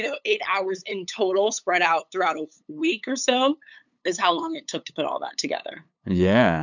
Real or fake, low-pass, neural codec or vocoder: fake; 7.2 kHz; codec, 16 kHz, 8 kbps, FunCodec, trained on LibriTTS, 25 frames a second